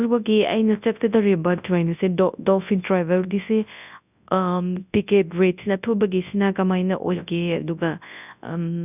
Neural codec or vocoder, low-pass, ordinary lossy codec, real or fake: codec, 24 kHz, 0.9 kbps, WavTokenizer, large speech release; 3.6 kHz; none; fake